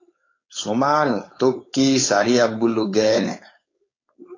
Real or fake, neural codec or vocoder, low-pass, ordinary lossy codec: fake; codec, 16 kHz, 4.8 kbps, FACodec; 7.2 kHz; AAC, 32 kbps